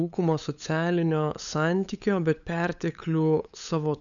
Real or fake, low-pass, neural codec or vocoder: fake; 7.2 kHz; codec, 16 kHz, 8 kbps, FunCodec, trained on LibriTTS, 25 frames a second